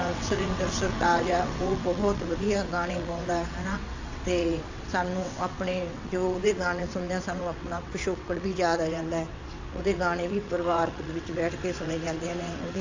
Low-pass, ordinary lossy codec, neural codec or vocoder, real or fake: 7.2 kHz; AAC, 48 kbps; vocoder, 22.05 kHz, 80 mel bands, WaveNeXt; fake